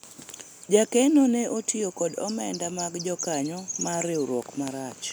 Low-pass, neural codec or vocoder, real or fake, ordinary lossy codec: none; none; real; none